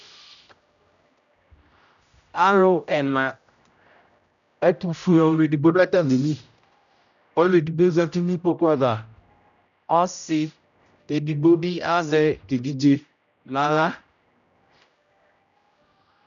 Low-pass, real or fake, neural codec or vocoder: 7.2 kHz; fake; codec, 16 kHz, 0.5 kbps, X-Codec, HuBERT features, trained on general audio